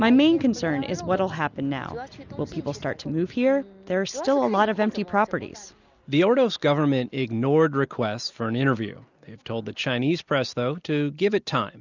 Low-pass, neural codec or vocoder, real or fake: 7.2 kHz; none; real